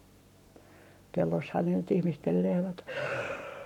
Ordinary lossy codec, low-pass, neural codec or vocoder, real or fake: none; 19.8 kHz; codec, 44.1 kHz, 7.8 kbps, Pupu-Codec; fake